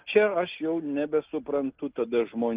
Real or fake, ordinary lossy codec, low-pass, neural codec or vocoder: real; Opus, 24 kbps; 3.6 kHz; none